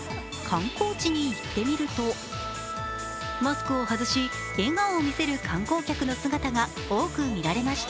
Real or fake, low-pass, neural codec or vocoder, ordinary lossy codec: real; none; none; none